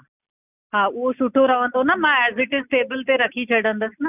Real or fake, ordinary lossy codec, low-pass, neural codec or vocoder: real; none; 3.6 kHz; none